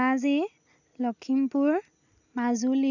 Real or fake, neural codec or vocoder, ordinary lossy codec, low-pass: real; none; none; 7.2 kHz